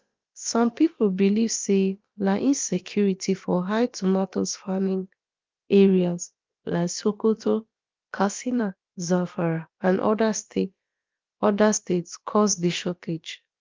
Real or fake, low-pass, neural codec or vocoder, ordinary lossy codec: fake; 7.2 kHz; codec, 16 kHz, about 1 kbps, DyCAST, with the encoder's durations; Opus, 32 kbps